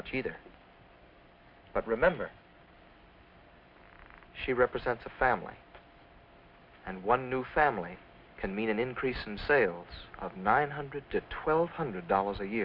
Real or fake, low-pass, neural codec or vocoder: real; 5.4 kHz; none